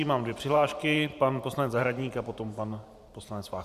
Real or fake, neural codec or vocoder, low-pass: fake; vocoder, 44.1 kHz, 128 mel bands every 512 samples, BigVGAN v2; 14.4 kHz